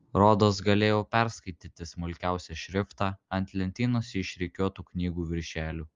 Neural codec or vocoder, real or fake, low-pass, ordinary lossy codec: none; real; 7.2 kHz; Opus, 32 kbps